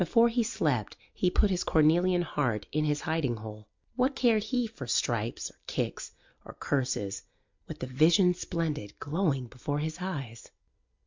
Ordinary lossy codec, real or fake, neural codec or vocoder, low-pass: MP3, 64 kbps; real; none; 7.2 kHz